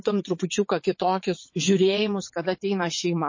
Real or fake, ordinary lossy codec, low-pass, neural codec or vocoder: fake; MP3, 32 kbps; 7.2 kHz; vocoder, 22.05 kHz, 80 mel bands, WaveNeXt